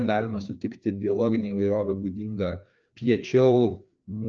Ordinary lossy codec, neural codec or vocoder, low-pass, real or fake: Opus, 24 kbps; codec, 16 kHz, 2 kbps, FreqCodec, larger model; 7.2 kHz; fake